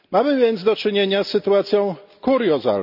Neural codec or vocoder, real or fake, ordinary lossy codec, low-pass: none; real; none; 5.4 kHz